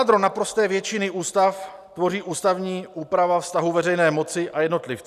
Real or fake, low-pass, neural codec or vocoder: real; 14.4 kHz; none